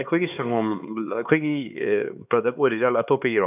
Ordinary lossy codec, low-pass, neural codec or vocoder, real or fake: none; 3.6 kHz; codec, 16 kHz, 4 kbps, X-Codec, HuBERT features, trained on LibriSpeech; fake